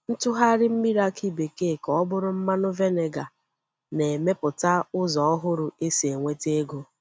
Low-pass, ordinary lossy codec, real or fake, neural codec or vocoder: none; none; real; none